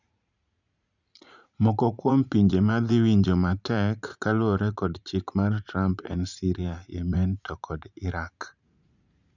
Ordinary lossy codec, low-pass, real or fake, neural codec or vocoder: none; 7.2 kHz; fake; vocoder, 44.1 kHz, 80 mel bands, Vocos